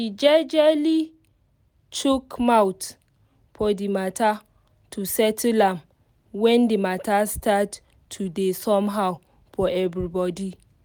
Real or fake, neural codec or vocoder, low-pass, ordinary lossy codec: real; none; none; none